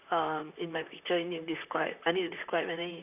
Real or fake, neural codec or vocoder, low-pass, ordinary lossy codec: fake; vocoder, 44.1 kHz, 128 mel bands, Pupu-Vocoder; 3.6 kHz; none